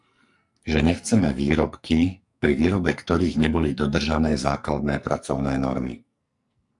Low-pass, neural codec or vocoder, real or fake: 10.8 kHz; codec, 44.1 kHz, 2.6 kbps, SNAC; fake